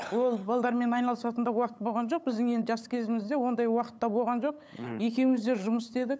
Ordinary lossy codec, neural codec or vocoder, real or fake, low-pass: none; codec, 16 kHz, 8 kbps, FunCodec, trained on LibriTTS, 25 frames a second; fake; none